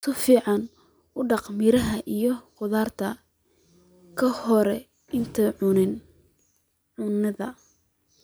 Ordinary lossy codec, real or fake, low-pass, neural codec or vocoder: none; real; none; none